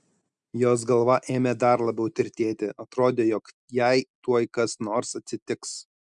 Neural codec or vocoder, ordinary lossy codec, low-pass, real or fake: none; MP3, 96 kbps; 10.8 kHz; real